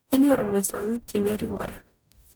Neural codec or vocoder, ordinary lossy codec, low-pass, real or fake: codec, 44.1 kHz, 0.9 kbps, DAC; none; none; fake